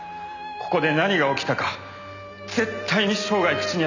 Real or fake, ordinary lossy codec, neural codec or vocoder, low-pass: real; none; none; 7.2 kHz